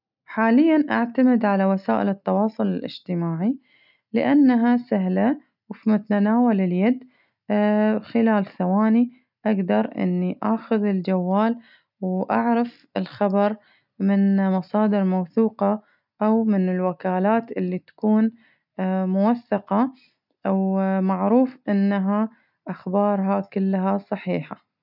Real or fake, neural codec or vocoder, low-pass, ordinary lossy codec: real; none; 5.4 kHz; none